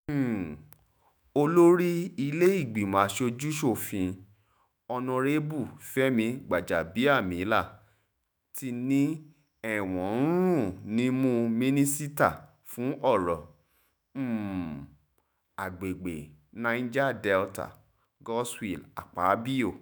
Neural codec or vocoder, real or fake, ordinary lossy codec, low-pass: autoencoder, 48 kHz, 128 numbers a frame, DAC-VAE, trained on Japanese speech; fake; none; none